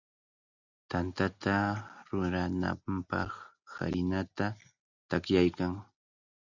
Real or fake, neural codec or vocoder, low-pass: real; none; 7.2 kHz